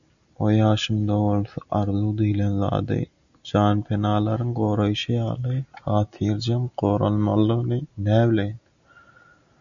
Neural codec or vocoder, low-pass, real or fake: none; 7.2 kHz; real